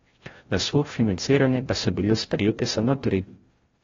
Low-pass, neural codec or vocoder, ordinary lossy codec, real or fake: 7.2 kHz; codec, 16 kHz, 0.5 kbps, FreqCodec, larger model; AAC, 24 kbps; fake